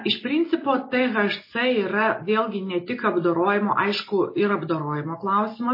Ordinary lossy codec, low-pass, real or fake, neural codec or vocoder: MP3, 24 kbps; 5.4 kHz; real; none